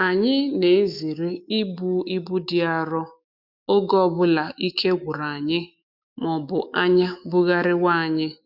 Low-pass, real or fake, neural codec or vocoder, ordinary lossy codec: 5.4 kHz; fake; autoencoder, 48 kHz, 128 numbers a frame, DAC-VAE, trained on Japanese speech; none